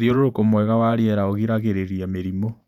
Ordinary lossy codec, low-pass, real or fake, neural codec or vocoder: none; 19.8 kHz; fake; vocoder, 48 kHz, 128 mel bands, Vocos